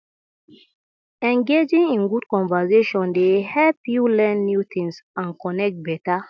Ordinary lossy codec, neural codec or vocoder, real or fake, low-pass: none; none; real; none